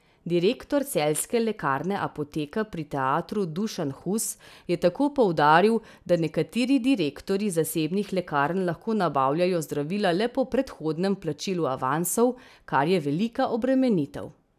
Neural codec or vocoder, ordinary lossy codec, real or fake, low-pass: none; none; real; 14.4 kHz